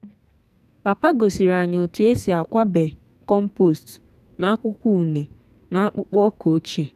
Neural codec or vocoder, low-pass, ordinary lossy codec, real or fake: codec, 44.1 kHz, 2.6 kbps, SNAC; 14.4 kHz; none; fake